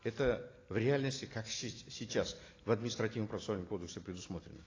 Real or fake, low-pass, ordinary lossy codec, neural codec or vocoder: real; 7.2 kHz; AAC, 32 kbps; none